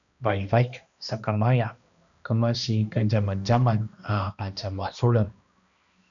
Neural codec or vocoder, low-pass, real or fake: codec, 16 kHz, 1 kbps, X-Codec, HuBERT features, trained on balanced general audio; 7.2 kHz; fake